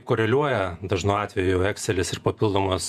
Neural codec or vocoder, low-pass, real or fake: vocoder, 48 kHz, 128 mel bands, Vocos; 14.4 kHz; fake